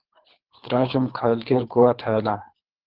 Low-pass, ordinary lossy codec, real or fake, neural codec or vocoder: 5.4 kHz; Opus, 24 kbps; fake; codec, 24 kHz, 3 kbps, HILCodec